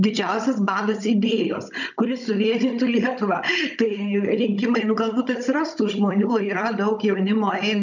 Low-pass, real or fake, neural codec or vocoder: 7.2 kHz; fake; codec, 16 kHz, 16 kbps, FunCodec, trained on Chinese and English, 50 frames a second